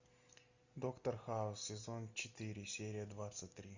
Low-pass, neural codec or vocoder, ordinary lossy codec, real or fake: 7.2 kHz; none; Opus, 64 kbps; real